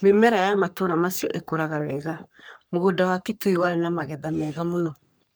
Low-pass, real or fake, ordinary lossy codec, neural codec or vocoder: none; fake; none; codec, 44.1 kHz, 3.4 kbps, Pupu-Codec